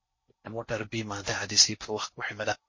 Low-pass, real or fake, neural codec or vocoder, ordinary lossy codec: 7.2 kHz; fake; codec, 16 kHz in and 24 kHz out, 0.6 kbps, FocalCodec, streaming, 4096 codes; MP3, 32 kbps